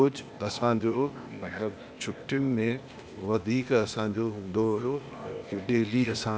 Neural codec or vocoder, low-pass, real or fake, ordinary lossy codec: codec, 16 kHz, 0.8 kbps, ZipCodec; none; fake; none